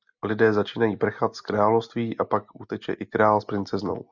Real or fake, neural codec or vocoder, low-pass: real; none; 7.2 kHz